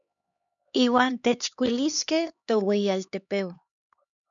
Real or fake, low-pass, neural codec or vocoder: fake; 7.2 kHz; codec, 16 kHz, 2 kbps, X-Codec, HuBERT features, trained on LibriSpeech